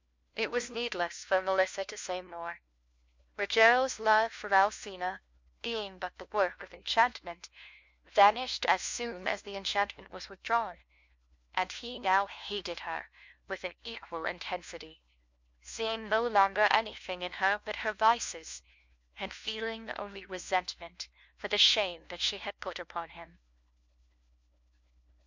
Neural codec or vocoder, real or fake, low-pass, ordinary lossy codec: codec, 16 kHz, 1 kbps, FunCodec, trained on LibriTTS, 50 frames a second; fake; 7.2 kHz; MP3, 64 kbps